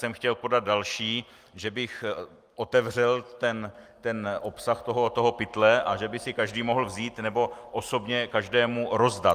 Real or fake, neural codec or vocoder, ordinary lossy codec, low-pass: real; none; Opus, 32 kbps; 14.4 kHz